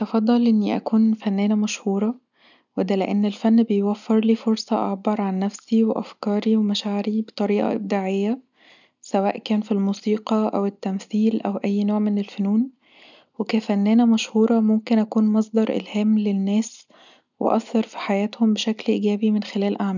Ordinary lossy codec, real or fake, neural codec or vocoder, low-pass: none; real; none; 7.2 kHz